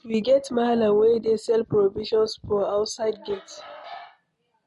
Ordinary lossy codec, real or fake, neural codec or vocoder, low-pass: MP3, 48 kbps; real; none; 14.4 kHz